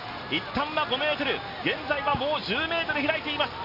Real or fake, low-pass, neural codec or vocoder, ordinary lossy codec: real; 5.4 kHz; none; none